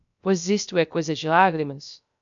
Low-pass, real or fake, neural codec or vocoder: 7.2 kHz; fake; codec, 16 kHz, 0.3 kbps, FocalCodec